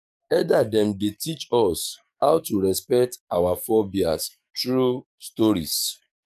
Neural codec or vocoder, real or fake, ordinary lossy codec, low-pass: codec, 44.1 kHz, 7.8 kbps, DAC; fake; none; 14.4 kHz